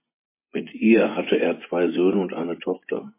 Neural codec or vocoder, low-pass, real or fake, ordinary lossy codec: vocoder, 24 kHz, 100 mel bands, Vocos; 3.6 kHz; fake; MP3, 24 kbps